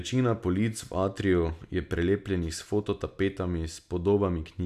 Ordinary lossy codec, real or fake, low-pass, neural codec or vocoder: none; real; 14.4 kHz; none